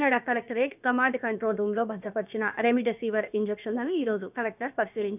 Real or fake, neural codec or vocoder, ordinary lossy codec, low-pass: fake; codec, 16 kHz, about 1 kbps, DyCAST, with the encoder's durations; none; 3.6 kHz